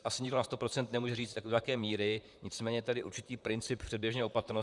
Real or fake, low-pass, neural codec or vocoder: fake; 10.8 kHz; vocoder, 44.1 kHz, 128 mel bands, Pupu-Vocoder